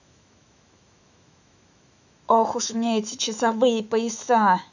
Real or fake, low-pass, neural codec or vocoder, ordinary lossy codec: fake; 7.2 kHz; autoencoder, 48 kHz, 128 numbers a frame, DAC-VAE, trained on Japanese speech; none